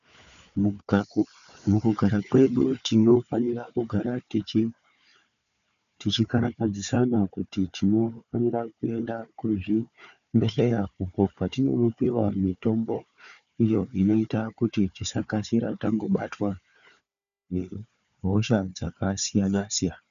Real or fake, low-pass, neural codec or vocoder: fake; 7.2 kHz; codec, 16 kHz, 4 kbps, FunCodec, trained on Chinese and English, 50 frames a second